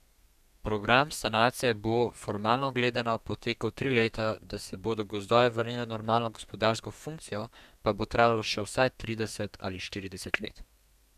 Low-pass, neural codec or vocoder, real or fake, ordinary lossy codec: 14.4 kHz; codec, 32 kHz, 1.9 kbps, SNAC; fake; none